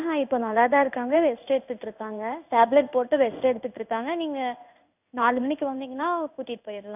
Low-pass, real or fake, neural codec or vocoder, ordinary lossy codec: 3.6 kHz; fake; codec, 16 kHz in and 24 kHz out, 1 kbps, XY-Tokenizer; none